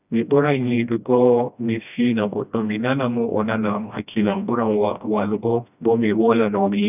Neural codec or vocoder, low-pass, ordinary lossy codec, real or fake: codec, 16 kHz, 1 kbps, FreqCodec, smaller model; 3.6 kHz; none; fake